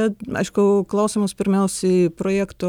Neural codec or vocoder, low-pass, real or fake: none; 19.8 kHz; real